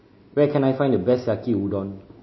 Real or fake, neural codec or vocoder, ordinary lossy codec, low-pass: real; none; MP3, 24 kbps; 7.2 kHz